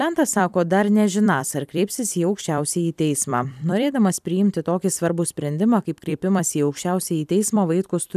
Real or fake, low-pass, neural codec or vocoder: fake; 14.4 kHz; vocoder, 44.1 kHz, 128 mel bands every 256 samples, BigVGAN v2